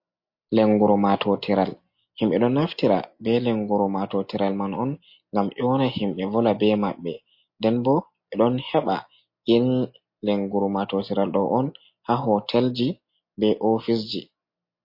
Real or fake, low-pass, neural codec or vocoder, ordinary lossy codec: real; 5.4 kHz; none; MP3, 32 kbps